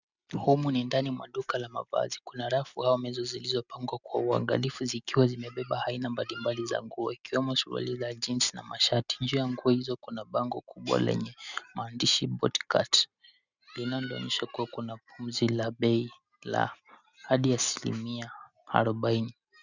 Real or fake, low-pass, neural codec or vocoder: real; 7.2 kHz; none